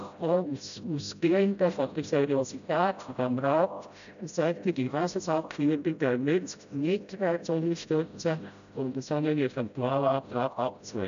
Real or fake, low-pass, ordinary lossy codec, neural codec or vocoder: fake; 7.2 kHz; AAC, 96 kbps; codec, 16 kHz, 0.5 kbps, FreqCodec, smaller model